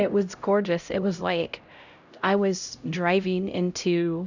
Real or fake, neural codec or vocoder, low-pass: fake; codec, 16 kHz, 0.5 kbps, X-Codec, HuBERT features, trained on LibriSpeech; 7.2 kHz